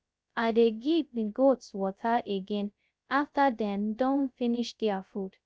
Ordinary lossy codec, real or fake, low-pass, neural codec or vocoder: none; fake; none; codec, 16 kHz, 0.2 kbps, FocalCodec